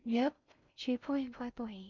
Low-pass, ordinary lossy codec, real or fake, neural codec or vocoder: 7.2 kHz; none; fake; codec, 16 kHz in and 24 kHz out, 0.6 kbps, FocalCodec, streaming, 4096 codes